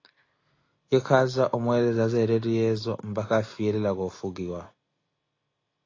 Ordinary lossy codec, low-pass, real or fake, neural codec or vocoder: AAC, 32 kbps; 7.2 kHz; real; none